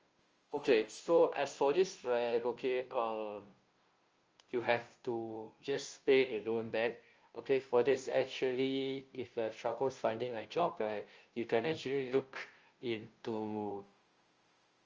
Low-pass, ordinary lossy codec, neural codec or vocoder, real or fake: 7.2 kHz; Opus, 24 kbps; codec, 16 kHz, 0.5 kbps, FunCodec, trained on Chinese and English, 25 frames a second; fake